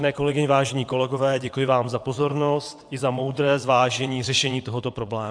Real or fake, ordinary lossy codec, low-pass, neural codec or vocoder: fake; AAC, 64 kbps; 9.9 kHz; vocoder, 22.05 kHz, 80 mel bands, WaveNeXt